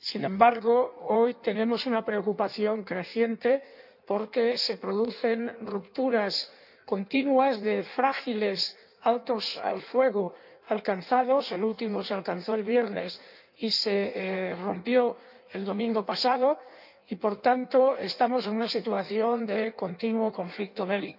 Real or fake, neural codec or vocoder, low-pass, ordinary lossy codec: fake; codec, 16 kHz in and 24 kHz out, 1.1 kbps, FireRedTTS-2 codec; 5.4 kHz; none